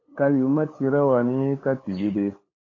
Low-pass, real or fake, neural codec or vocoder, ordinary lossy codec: 7.2 kHz; fake; codec, 16 kHz, 8 kbps, FunCodec, trained on LibriTTS, 25 frames a second; AAC, 32 kbps